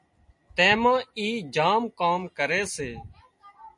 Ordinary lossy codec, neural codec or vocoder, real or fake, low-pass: MP3, 48 kbps; none; real; 10.8 kHz